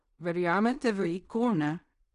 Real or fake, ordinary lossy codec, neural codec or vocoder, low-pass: fake; none; codec, 16 kHz in and 24 kHz out, 0.4 kbps, LongCat-Audio-Codec, fine tuned four codebook decoder; 10.8 kHz